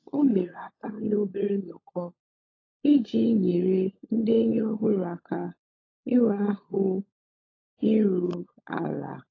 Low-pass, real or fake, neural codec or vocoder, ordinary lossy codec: 7.2 kHz; fake; codec, 16 kHz, 16 kbps, FunCodec, trained on LibriTTS, 50 frames a second; AAC, 32 kbps